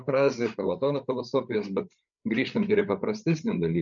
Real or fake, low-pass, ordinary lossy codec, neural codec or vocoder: fake; 7.2 kHz; MP3, 96 kbps; codec, 16 kHz, 8 kbps, FreqCodec, larger model